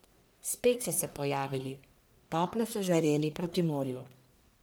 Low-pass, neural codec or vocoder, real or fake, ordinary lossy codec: none; codec, 44.1 kHz, 1.7 kbps, Pupu-Codec; fake; none